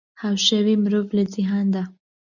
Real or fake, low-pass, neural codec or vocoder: real; 7.2 kHz; none